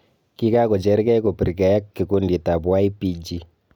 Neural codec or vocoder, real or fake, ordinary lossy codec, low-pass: none; real; none; 19.8 kHz